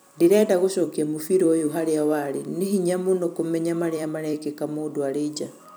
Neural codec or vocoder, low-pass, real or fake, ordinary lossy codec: vocoder, 44.1 kHz, 128 mel bands every 256 samples, BigVGAN v2; none; fake; none